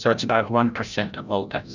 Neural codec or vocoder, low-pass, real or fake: codec, 16 kHz, 0.5 kbps, FreqCodec, larger model; 7.2 kHz; fake